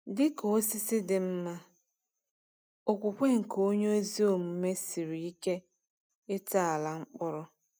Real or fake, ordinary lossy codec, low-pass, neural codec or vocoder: real; none; none; none